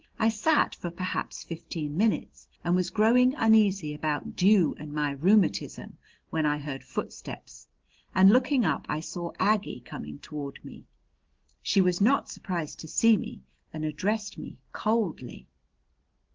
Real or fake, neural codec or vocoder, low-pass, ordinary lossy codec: real; none; 7.2 kHz; Opus, 16 kbps